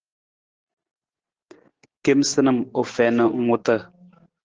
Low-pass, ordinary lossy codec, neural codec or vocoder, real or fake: 7.2 kHz; Opus, 16 kbps; none; real